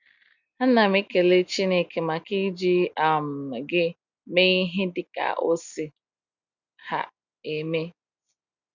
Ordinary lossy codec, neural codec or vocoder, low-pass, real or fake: none; none; 7.2 kHz; real